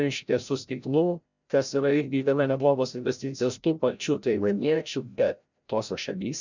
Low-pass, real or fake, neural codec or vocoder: 7.2 kHz; fake; codec, 16 kHz, 0.5 kbps, FreqCodec, larger model